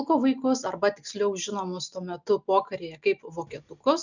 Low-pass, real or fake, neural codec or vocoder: 7.2 kHz; real; none